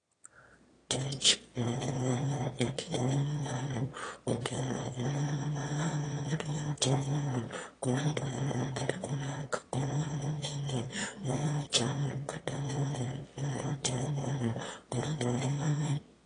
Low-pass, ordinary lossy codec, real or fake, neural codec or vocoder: 9.9 kHz; AAC, 32 kbps; fake; autoencoder, 22.05 kHz, a latent of 192 numbers a frame, VITS, trained on one speaker